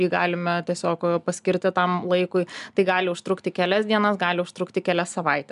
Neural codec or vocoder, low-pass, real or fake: none; 10.8 kHz; real